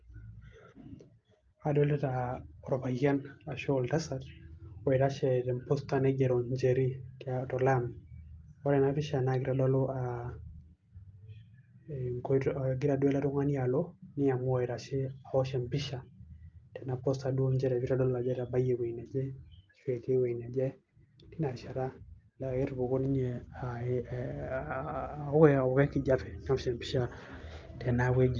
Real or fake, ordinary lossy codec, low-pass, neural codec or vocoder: real; Opus, 24 kbps; 7.2 kHz; none